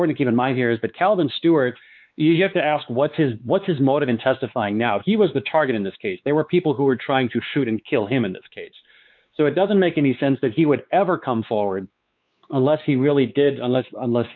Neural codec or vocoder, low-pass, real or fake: codec, 16 kHz, 4 kbps, X-Codec, WavLM features, trained on Multilingual LibriSpeech; 7.2 kHz; fake